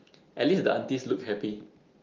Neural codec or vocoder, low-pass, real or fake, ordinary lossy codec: none; 7.2 kHz; real; Opus, 32 kbps